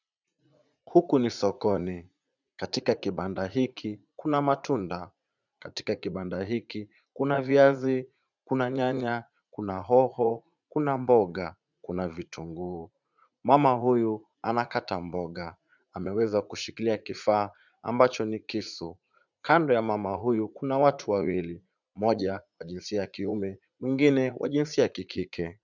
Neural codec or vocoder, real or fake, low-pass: vocoder, 44.1 kHz, 80 mel bands, Vocos; fake; 7.2 kHz